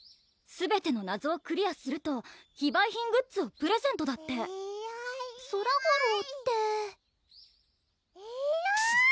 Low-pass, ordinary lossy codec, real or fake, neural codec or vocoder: none; none; real; none